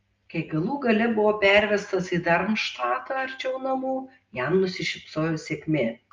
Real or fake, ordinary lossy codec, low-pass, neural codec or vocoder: real; Opus, 24 kbps; 7.2 kHz; none